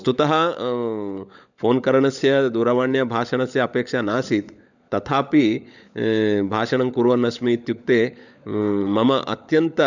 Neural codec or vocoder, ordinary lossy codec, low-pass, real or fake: codec, 16 kHz, 16 kbps, FunCodec, trained on LibriTTS, 50 frames a second; none; 7.2 kHz; fake